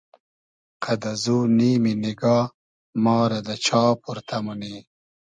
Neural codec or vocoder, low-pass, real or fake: none; 9.9 kHz; real